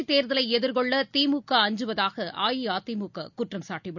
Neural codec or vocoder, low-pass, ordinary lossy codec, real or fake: none; 7.2 kHz; none; real